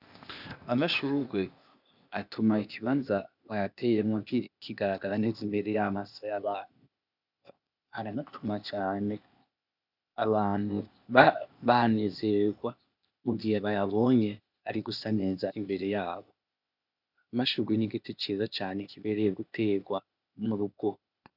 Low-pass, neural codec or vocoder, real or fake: 5.4 kHz; codec, 16 kHz, 0.8 kbps, ZipCodec; fake